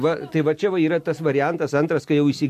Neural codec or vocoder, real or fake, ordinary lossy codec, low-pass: none; real; MP3, 64 kbps; 14.4 kHz